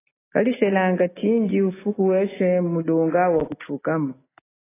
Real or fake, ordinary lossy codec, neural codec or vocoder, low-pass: real; AAC, 16 kbps; none; 3.6 kHz